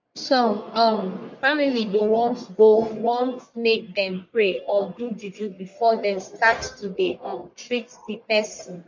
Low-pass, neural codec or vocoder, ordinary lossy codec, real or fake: 7.2 kHz; codec, 44.1 kHz, 1.7 kbps, Pupu-Codec; MP3, 48 kbps; fake